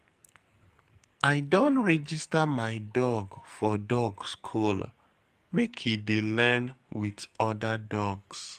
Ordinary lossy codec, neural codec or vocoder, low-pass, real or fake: Opus, 32 kbps; codec, 44.1 kHz, 2.6 kbps, SNAC; 14.4 kHz; fake